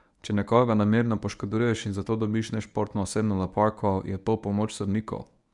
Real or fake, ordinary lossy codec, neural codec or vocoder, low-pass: fake; none; codec, 24 kHz, 0.9 kbps, WavTokenizer, medium speech release version 1; 10.8 kHz